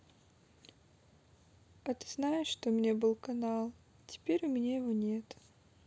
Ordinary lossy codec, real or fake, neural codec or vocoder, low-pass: none; real; none; none